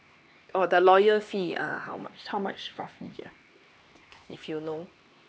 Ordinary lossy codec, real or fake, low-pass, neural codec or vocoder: none; fake; none; codec, 16 kHz, 2 kbps, X-Codec, HuBERT features, trained on LibriSpeech